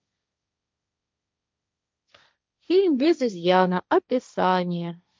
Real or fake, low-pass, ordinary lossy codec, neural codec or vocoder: fake; none; none; codec, 16 kHz, 1.1 kbps, Voila-Tokenizer